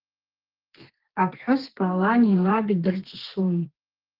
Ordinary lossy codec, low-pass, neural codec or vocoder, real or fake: Opus, 16 kbps; 5.4 kHz; codec, 32 kHz, 1.9 kbps, SNAC; fake